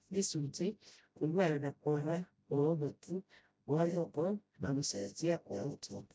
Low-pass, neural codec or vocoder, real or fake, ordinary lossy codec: none; codec, 16 kHz, 0.5 kbps, FreqCodec, smaller model; fake; none